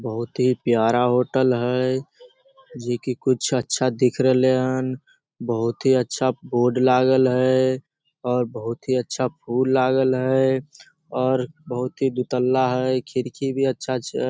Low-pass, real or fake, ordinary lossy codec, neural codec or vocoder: none; real; none; none